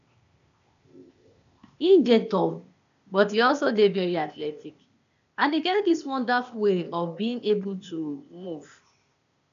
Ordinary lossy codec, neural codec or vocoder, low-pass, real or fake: none; codec, 16 kHz, 0.8 kbps, ZipCodec; 7.2 kHz; fake